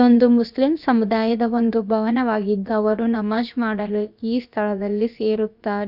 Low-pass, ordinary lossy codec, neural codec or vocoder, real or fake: 5.4 kHz; none; codec, 16 kHz, about 1 kbps, DyCAST, with the encoder's durations; fake